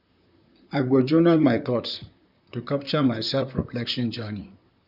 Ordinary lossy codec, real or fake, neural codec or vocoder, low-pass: none; fake; vocoder, 44.1 kHz, 128 mel bands, Pupu-Vocoder; 5.4 kHz